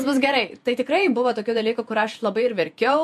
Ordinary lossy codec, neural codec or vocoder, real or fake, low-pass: MP3, 64 kbps; vocoder, 48 kHz, 128 mel bands, Vocos; fake; 14.4 kHz